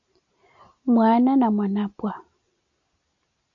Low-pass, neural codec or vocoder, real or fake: 7.2 kHz; none; real